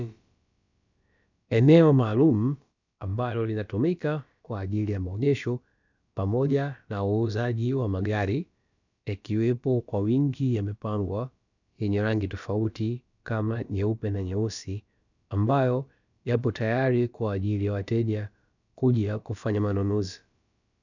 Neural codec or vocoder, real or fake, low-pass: codec, 16 kHz, about 1 kbps, DyCAST, with the encoder's durations; fake; 7.2 kHz